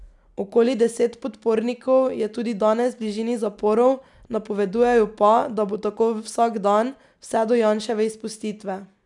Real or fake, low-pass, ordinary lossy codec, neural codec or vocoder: real; 10.8 kHz; none; none